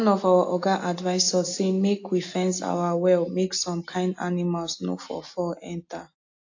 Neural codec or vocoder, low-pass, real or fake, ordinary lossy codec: none; 7.2 kHz; real; AAC, 48 kbps